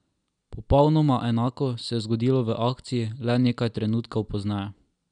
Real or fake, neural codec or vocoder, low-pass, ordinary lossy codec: real; none; 9.9 kHz; none